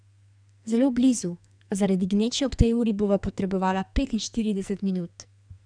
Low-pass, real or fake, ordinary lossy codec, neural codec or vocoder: 9.9 kHz; fake; none; codec, 44.1 kHz, 2.6 kbps, SNAC